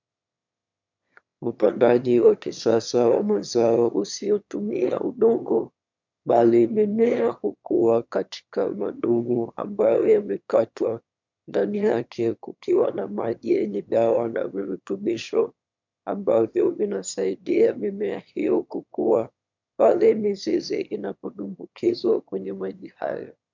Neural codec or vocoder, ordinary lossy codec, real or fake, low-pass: autoencoder, 22.05 kHz, a latent of 192 numbers a frame, VITS, trained on one speaker; MP3, 64 kbps; fake; 7.2 kHz